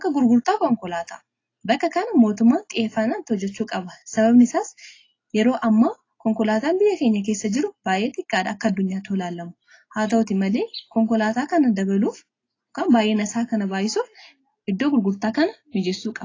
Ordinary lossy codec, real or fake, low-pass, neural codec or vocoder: AAC, 32 kbps; real; 7.2 kHz; none